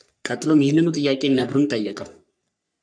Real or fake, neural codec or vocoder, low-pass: fake; codec, 44.1 kHz, 3.4 kbps, Pupu-Codec; 9.9 kHz